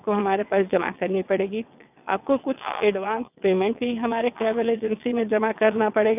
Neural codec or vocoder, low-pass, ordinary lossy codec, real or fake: vocoder, 22.05 kHz, 80 mel bands, WaveNeXt; 3.6 kHz; none; fake